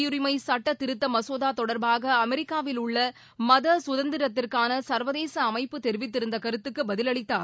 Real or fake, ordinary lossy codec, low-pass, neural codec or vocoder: real; none; none; none